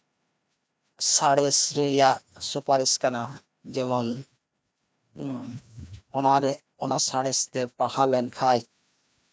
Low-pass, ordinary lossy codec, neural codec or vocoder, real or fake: none; none; codec, 16 kHz, 1 kbps, FreqCodec, larger model; fake